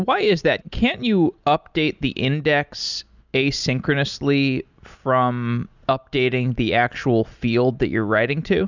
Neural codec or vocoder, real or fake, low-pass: none; real; 7.2 kHz